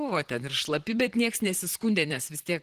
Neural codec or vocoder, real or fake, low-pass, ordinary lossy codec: vocoder, 44.1 kHz, 128 mel bands, Pupu-Vocoder; fake; 14.4 kHz; Opus, 16 kbps